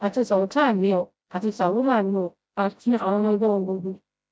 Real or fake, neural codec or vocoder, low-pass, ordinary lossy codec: fake; codec, 16 kHz, 0.5 kbps, FreqCodec, smaller model; none; none